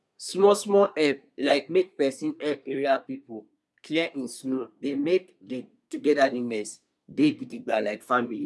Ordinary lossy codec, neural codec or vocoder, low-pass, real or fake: none; codec, 24 kHz, 1 kbps, SNAC; none; fake